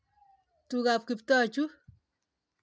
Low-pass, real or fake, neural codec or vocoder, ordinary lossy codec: none; real; none; none